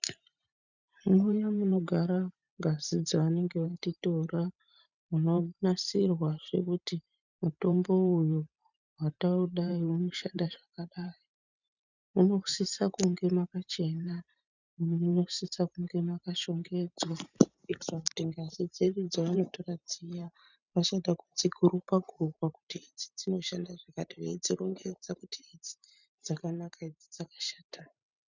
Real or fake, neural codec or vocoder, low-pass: fake; vocoder, 44.1 kHz, 128 mel bands every 512 samples, BigVGAN v2; 7.2 kHz